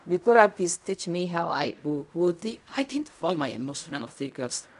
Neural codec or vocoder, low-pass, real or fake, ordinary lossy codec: codec, 16 kHz in and 24 kHz out, 0.4 kbps, LongCat-Audio-Codec, fine tuned four codebook decoder; 10.8 kHz; fake; AAC, 96 kbps